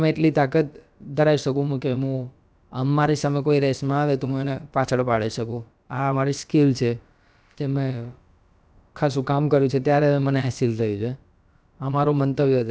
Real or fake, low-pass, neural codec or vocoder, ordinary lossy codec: fake; none; codec, 16 kHz, about 1 kbps, DyCAST, with the encoder's durations; none